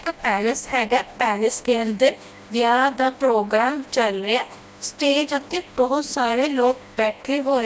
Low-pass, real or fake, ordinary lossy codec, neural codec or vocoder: none; fake; none; codec, 16 kHz, 1 kbps, FreqCodec, smaller model